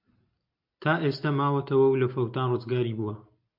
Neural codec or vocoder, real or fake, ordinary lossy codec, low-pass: none; real; MP3, 48 kbps; 5.4 kHz